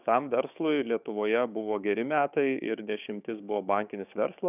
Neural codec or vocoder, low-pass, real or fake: codec, 16 kHz, 6 kbps, DAC; 3.6 kHz; fake